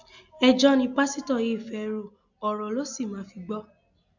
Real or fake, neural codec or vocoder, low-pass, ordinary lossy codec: real; none; 7.2 kHz; none